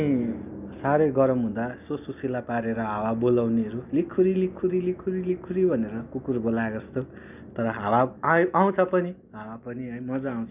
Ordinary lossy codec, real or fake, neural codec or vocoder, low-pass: none; real; none; 3.6 kHz